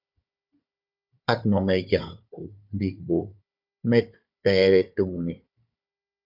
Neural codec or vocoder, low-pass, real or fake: codec, 16 kHz, 4 kbps, FunCodec, trained on Chinese and English, 50 frames a second; 5.4 kHz; fake